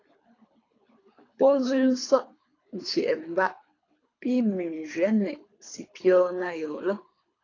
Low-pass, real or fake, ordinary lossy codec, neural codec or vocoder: 7.2 kHz; fake; AAC, 32 kbps; codec, 24 kHz, 3 kbps, HILCodec